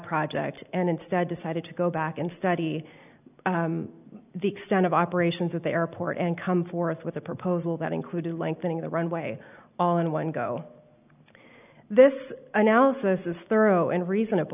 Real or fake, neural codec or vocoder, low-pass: real; none; 3.6 kHz